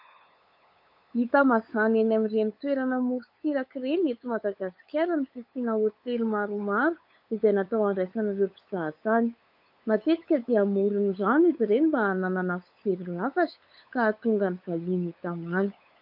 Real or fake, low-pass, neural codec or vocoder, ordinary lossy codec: fake; 5.4 kHz; codec, 16 kHz, 8 kbps, FunCodec, trained on LibriTTS, 25 frames a second; AAC, 48 kbps